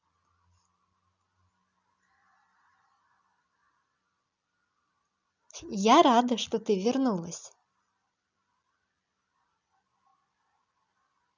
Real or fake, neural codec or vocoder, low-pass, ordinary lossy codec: real; none; 7.2 kHz; none